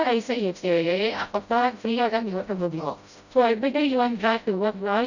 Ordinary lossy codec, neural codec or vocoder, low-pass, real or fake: none; codec, 16 kHz, 0.5 kbps, FreqCodec, smaller model; 7.2 kHz; fake